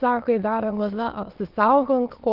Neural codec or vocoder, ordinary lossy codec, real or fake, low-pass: autoencoder, 22.05 kHz, a latent of 192 numbers a frame, VITS, trained on many speakers; Opus, 32 kbps; fake; 5.4 kHz